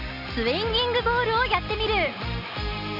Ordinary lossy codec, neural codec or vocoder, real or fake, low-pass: none; none; real; 5.4 kHz